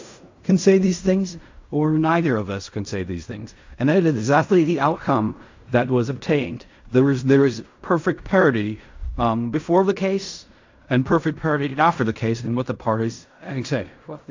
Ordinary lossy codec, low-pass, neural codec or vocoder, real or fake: AAC, 48 kbps; 7.2 kHz; codec, 16 kHz in and 24 kHz out, 0.4 kbps, LongCat-Audio-Codec, fine tuned four codebook decoder; fake